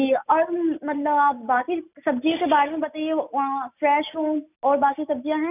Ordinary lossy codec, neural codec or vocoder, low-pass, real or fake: none; none; 3.6 kHz; real